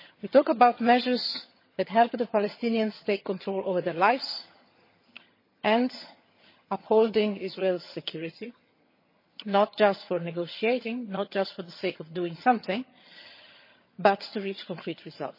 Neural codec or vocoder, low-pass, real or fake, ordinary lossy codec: vocoder, 22.05 kHz, 80 mel bands, HiFi-GAN; 5.4 kHz; fake; MP3, 24 kbps